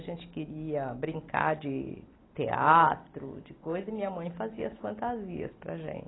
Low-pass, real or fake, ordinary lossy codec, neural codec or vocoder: 7.2 kHz; real; AAC, 16 kbps; none